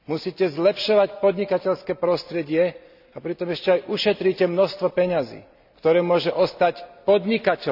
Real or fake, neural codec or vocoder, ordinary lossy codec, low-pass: real; none; none; 5.4 kHz